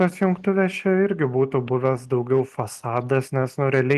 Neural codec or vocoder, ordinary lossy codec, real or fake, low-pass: none; Opus, 24 kbps; real; 14.4 kHz